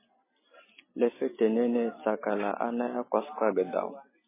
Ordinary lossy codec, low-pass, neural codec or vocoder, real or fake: MP3, 16 kbps; 3.6 kHz; none; real